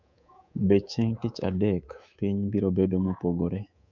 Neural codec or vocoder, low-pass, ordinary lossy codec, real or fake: codec, 24 kHz, 3.1 kbps, DualCodec; 7.2 kHz; none; fake